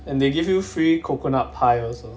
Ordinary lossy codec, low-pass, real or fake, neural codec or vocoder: none; none; real; none